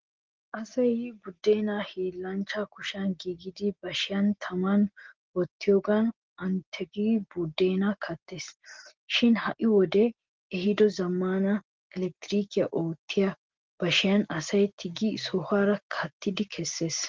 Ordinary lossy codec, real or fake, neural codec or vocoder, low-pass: Opus, 16 kbps; real; none; 7.2 kHz